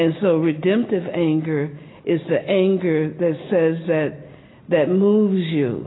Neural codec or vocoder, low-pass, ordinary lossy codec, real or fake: none; 7.2 kHz; AAC, 16 kbps; real